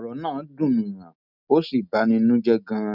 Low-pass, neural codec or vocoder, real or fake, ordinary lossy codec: 5.4 kHz; none; real; none